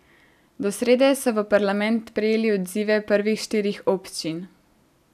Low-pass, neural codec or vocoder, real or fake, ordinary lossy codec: 14.4 kHz; none; real; none